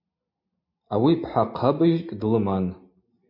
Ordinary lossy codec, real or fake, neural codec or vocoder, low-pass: MP3, 24 kbps; real; none; 5.4 kHz